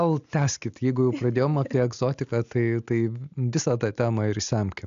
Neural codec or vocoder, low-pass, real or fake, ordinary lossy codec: none; 7.2 kHz; real; AAC, 96 kbps